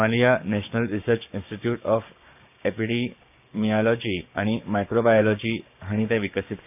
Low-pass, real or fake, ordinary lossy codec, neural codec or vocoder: 3.6 kHz; fake; none; codec, 16 kHz, 6 kbps, DAC